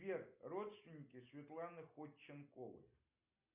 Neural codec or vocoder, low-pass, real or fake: none; 3.6 kHz; real